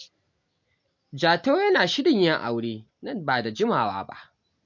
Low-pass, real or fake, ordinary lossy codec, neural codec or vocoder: 7.2 kHz; real; MP3, 48 kbps; none